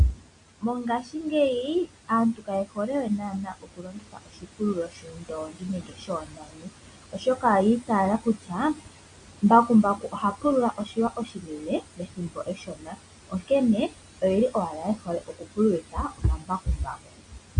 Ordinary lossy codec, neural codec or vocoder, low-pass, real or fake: AAC, 64 kbps; none; 9.9 kHz; real